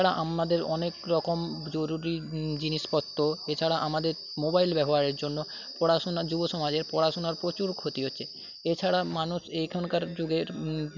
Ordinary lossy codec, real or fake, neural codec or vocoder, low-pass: none; real; none; 7.2 kHz